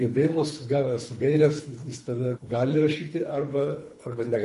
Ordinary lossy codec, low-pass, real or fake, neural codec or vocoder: MP3, 48 kbps; 10.8 kHz; fake; codec, 24 kHz, 3 kbps, HILCodec